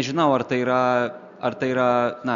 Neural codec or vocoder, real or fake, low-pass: none; real; 7.2 kHz